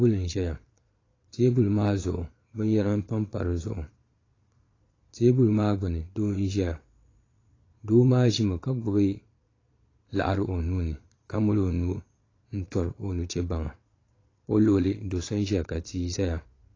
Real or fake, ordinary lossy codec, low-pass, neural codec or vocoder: fake; AAC, 32 kbps; 7.2 kHz; vocoder, 22.05 kHz, 80 mel bands, Vocos